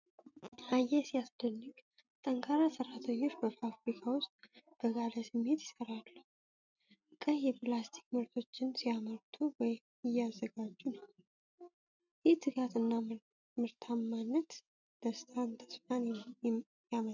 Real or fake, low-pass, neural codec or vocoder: real; 7.2 kHz; none